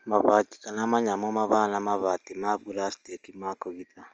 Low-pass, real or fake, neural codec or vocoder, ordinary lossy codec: 7.2 kHz; real; none; Opus, 24 kbps